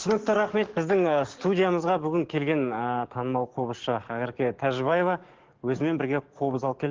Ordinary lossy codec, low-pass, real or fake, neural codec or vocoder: Opus, 16 kbps; 7.2 kHz; fake; codec, 44.1 kHz, 7.8 kbps, DAC